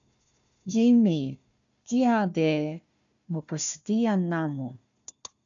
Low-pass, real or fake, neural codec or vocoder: 7.2 kHz; fake; codec, 16 kHz, 1 kbps, FunCodec, trained on Chinese and English, 50 frames a second